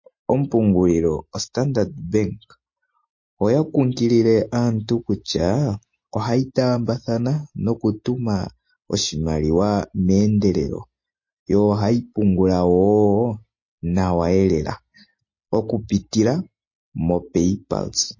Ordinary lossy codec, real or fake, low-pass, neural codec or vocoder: MP3, 32 kbps; real; 7.2 kHz; none